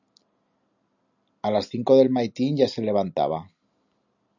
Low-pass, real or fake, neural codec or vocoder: 7.2 kHz; real; none